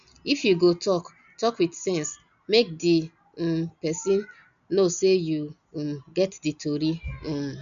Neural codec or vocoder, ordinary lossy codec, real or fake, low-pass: none; none; real; 7.2 kHz